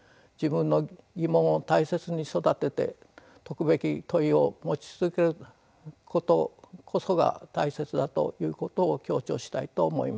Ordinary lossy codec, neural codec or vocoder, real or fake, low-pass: none; none; real; none